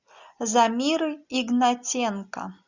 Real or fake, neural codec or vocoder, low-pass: real; none; 7.2 kHz